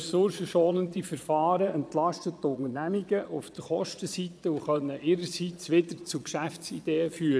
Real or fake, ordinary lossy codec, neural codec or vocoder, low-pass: fake; none; vocoder, 22.05 kHz, 80 mel bands, Vocos; none